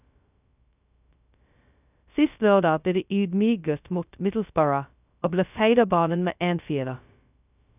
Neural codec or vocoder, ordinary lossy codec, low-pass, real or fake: codec, 16 kHz, 0.2 kbps, FocalCodec; none; 3.6 kHz; fake